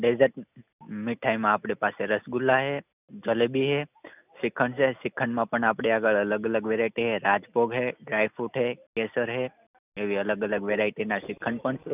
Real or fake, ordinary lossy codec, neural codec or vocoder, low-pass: real; none; none; 3.6 kHz